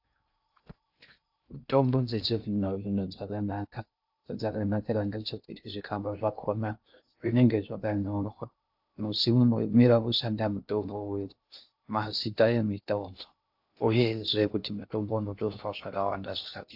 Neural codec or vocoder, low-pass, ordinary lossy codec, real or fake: codec, 16 kHz in and 24 kHz out, 0.6 kbps, FocalCodec, streaming, 2048 codes; 5.4 kHz; AAC, 48 kbps; fake